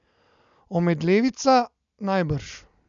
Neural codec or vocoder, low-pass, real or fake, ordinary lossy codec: none; 7.2 kHz; real; none